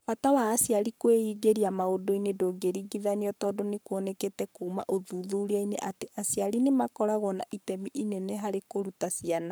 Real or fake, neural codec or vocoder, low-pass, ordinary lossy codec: fake; codec, 44.1 kHz, 7.8 kbps, Pupu-Codec; none; none